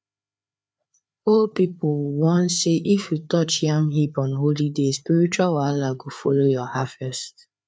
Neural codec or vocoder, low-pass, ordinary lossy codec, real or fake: codec, 16 kHz, 4 kbps, FreqCodec, larger model; none; none; fake